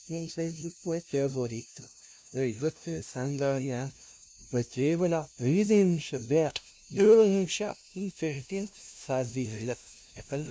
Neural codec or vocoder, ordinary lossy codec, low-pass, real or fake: codec, 16 kHz, 0.5 kbps, FunCodec, trained on LibriTTS, 25 frames a second; none; none; fake